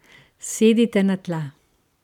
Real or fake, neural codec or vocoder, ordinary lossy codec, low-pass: fake; vocoder, 44.1 kHz, 128 mel bands every 512 samples, BigVGAN v2; none; 19.8 kHz